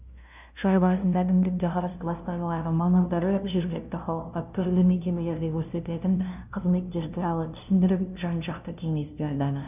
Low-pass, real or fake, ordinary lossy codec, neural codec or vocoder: 3.6 kHz; fake; none; codec, 16 kHz, 0.5 kbps, FunCodec, trained on LibriTTS, 25 frames a second